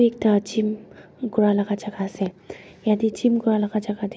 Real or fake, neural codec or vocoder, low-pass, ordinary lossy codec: real; none; none; none